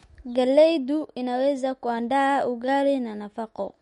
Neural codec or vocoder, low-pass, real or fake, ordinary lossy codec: autoencoder, 48 kHz, 128 numbers a frame, DAC-VAE, trained on Japanese speech; 19.8 kHz; fake; MP3, 48 kbps